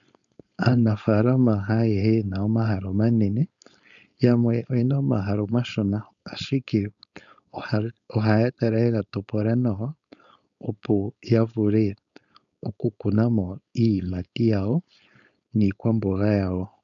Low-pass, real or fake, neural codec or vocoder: 7.2 kHz; fake; codec, 16 kHz, 4.8 kbps, FACodec